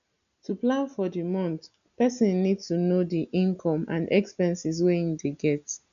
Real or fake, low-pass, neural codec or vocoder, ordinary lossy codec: real; 7.2 kHz; none; none